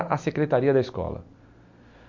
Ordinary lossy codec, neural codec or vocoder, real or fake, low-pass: MP3, 48 kbps; none; real; 7.2 kHz